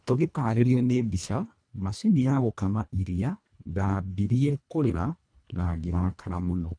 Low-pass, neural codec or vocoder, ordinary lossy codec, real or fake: 9.9 kHz; codec, 24 kHz, 1.5 kbps, HILCodec; none; fake